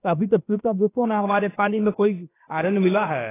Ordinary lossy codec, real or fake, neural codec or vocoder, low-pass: AAC, 16 kbps; fake; codec, 16 kHz, 0.7 kbps, FocalCodec; 3.6 kHz